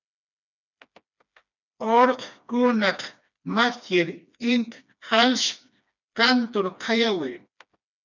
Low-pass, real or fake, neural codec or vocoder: 7.2 kHz; fake; codec, 16 kHz, 2 kbps, FreqCodec, smaller model